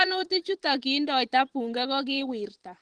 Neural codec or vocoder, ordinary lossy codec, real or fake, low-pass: vocoder, 44.1 kHz, 128 mel bands every 512 samples, BigVGAN v2; Opus, 16 kbps; fake; 10.8 kHz